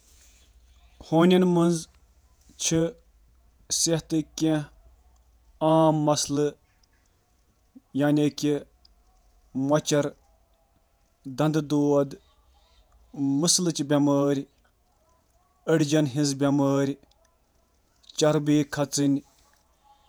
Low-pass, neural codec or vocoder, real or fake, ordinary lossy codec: none; vocoder, 48 kHz, 128 mel bands, Vocos; fake; none